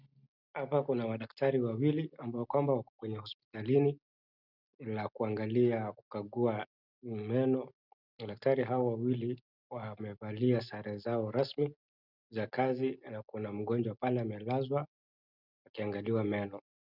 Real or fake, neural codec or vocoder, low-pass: real; none; 5.4 kHz